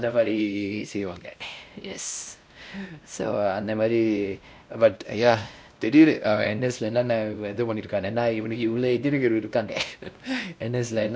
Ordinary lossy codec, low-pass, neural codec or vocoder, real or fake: none; none; codec, 16 kHz, 1 kbps, X-Codec, WavLM features, trained on Multilingual LibriSpeech; fake